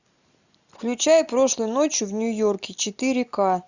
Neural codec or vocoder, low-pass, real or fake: none; 7.2 kHz; real